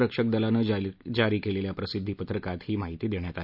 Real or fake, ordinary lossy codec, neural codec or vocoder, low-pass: real; none; none; 5.4 kHz